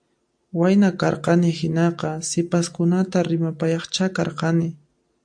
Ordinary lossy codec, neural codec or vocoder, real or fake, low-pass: AAC, 64 kbps; none; real; 9.9 kHz